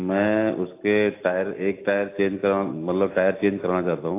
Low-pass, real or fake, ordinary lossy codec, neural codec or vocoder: 3.6 kHz; real; AAC, 24 kbps; none